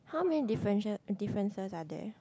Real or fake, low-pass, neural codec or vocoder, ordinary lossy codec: real; none; none; none